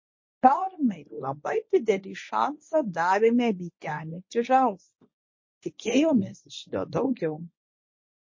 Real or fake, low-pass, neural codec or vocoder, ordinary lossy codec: fake; 7.2 kHz; codec, 24 kHz, 0.9 kbps, WavTokenizer, medium speech release version 1; MP3, 32 kbps